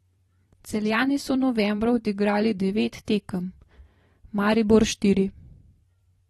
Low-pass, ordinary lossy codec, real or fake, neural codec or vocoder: 19.8 kHz; AAC, 32 kbps; fake; vocoder, 44.1 kHz, 128 mel bands every 256 samples, BigVGAN v2